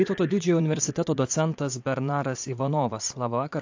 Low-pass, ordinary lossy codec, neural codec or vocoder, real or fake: 7.2 kHz; AAC, 48 kbps; vocoder, 44.1 kHz, 80 mel bands, Vocos; fake